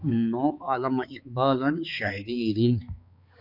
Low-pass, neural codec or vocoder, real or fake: 5.4 kHz; codec, 16 kHz, 4 kbps, X-Codec, HuBERT features, trained on balanced general audio; fake